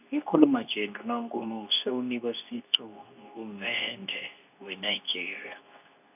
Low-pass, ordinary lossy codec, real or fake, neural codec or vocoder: 3.6 kHz; none; fake; codec, 24 kHz, 0.9 kbps, WavTokenizer, medium speech release version 2